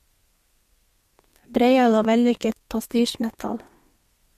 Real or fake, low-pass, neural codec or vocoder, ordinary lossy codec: fake; 14.4 kHz; codec, 32 kHz, 1.9 kbps, SNAC; MP3, 64 kbps